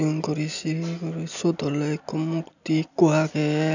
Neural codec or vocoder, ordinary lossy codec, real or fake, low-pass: none; none; real; 7.2 kHz